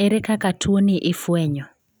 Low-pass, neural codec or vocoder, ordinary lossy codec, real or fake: none; none; none; real